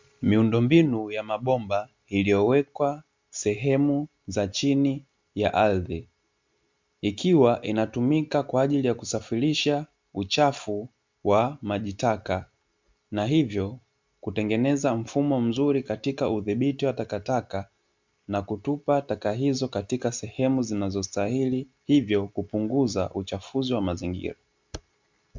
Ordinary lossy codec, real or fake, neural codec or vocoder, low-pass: MP3, 64 kbps; real; none; 7.2 kHz